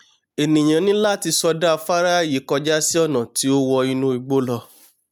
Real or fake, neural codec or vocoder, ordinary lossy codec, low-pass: real; none; none; none